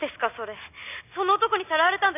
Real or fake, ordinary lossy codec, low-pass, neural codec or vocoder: real; none; 3.6 kHz; none